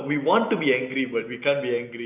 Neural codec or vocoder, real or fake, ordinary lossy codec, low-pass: none; real; none; 3.6 kHz